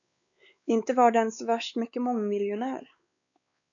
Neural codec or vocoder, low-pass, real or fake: codec, 16 kHz, 4 kbps, X-Codec, WavLM features, trained on Multilingual LibriSpeech; 7.2 kHz; fake